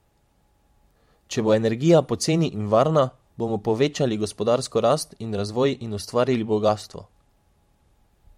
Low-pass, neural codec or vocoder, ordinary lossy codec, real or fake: 19.8 kHz; vocoder, 44.1 kHz, 128 mel bands every 512 samples, BigVGAN v2; MP3, 64 kbps; fake